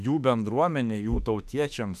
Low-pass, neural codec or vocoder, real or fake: 14.4 kHz; autoencoder, 48 kHz, 32 numbers a frame, DAC-VAE, trained on Japanese speech; fake